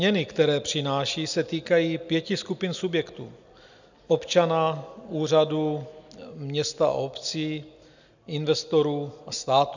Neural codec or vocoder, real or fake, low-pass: none; real; 7.2 kHz